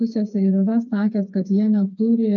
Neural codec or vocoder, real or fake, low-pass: codec, 16 kHz, 4 kbps, FreqCodec, smaller model; fake; 7.2 kHz